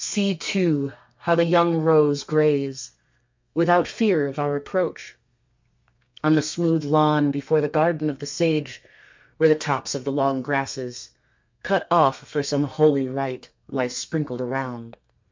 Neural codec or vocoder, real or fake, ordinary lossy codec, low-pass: codec, 32 kHz, 1.9 kbps, SNAC; fake; MP3, 64 kbps; 7.2 kHz